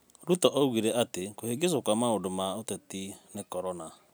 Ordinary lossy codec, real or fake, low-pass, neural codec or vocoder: none; real; none; none